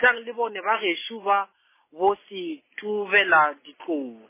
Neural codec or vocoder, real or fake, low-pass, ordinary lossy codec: none; real; 3.6 kHz; MP3, 16 kbps